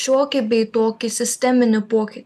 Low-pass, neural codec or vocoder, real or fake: 14.4 kHz; none; real